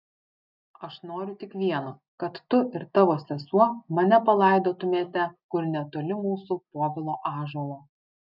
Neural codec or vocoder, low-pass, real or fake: none; 5.4 kHz; real